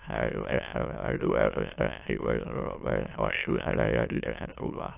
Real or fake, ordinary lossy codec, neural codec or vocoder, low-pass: fake; AAC, 32 kbps; autoencoder, 22.05 kHz, a latent of 192 numbers a frame, VITS, trained on many speakers; 3.6 kHz